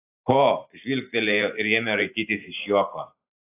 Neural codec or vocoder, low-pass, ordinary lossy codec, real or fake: vocoder, 44.1 kHz, 128 mel bands every 512 samples, BigVGAN v2; 3.6 kHz; AAC, 24 kbps; fake